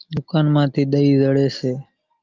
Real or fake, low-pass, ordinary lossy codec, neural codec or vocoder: real; 7.2 kHz; Opus, 32 kbps; none